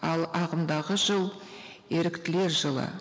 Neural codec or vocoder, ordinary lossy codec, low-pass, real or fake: none; none; none; real